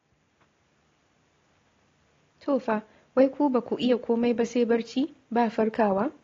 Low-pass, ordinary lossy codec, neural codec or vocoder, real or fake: 7.2 kHz; AAC, 32 kbps; none; real